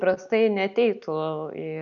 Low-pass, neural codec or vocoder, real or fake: 7.2 kHz; none; real